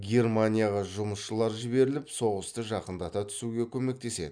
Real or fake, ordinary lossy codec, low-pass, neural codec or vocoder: real; none; 9.9 kHz; none